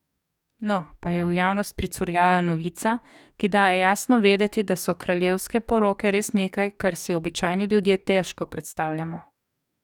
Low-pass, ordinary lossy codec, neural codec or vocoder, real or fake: 19.8 kHz; none; codec, 44.1 kHz, 2.6 kbps, DAC; fake